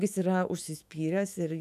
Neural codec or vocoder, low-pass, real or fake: autoencoder, 48 kHz, 128 numbers a frame, DAC-VAE, trained on Japanese speech; 14.4 kHz; fake